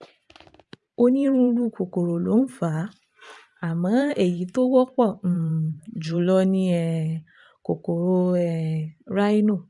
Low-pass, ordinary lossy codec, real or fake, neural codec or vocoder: 10.8 kHz; none; fake; vocoder, 44.1 kHz, 128 mel bands every 512 samples, BigVGAN v2